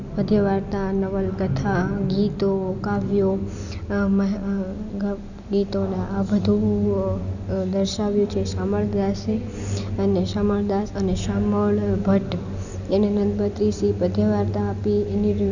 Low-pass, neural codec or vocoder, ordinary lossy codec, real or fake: 7.2 kHz; none; none; real